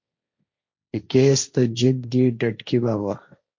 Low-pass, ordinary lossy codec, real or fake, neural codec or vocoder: 7.2 kHz; MP3, 48 kbps; fake; codec, 16 kHz, 1.1 kbps, Voila-Tokenizer